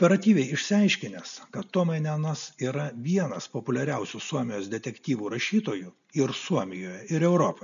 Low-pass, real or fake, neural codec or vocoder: 7.2 kHz; real; none